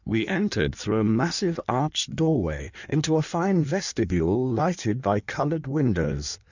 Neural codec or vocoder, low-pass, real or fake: codec, 16 kHz in and 24 kHz out, 1.1 kbps, FireRedTTS-2 codec; 7.2 kHz; fake